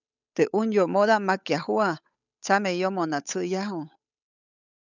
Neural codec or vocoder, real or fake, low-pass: codec, 16 kHz, 8 kbps, FunCodec, trained on Chinese and English, 25 frames a second; fake; 7.2 kHz